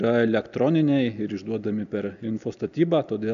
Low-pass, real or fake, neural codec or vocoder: 7.2 kHz; real; none